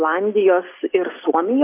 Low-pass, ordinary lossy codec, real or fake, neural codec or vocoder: 3.6 kHz; AAC, 24 kbps; real; none